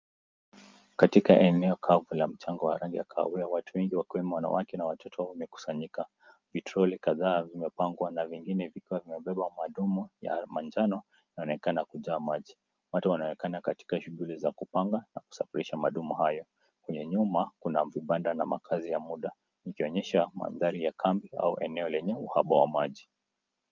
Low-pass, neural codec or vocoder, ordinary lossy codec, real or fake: 7.2 kHz; none; Opus, 32 kbps; real